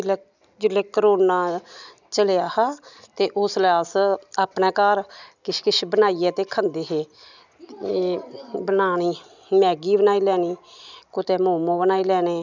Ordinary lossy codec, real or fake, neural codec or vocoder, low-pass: none; real; none; 7.2 kHz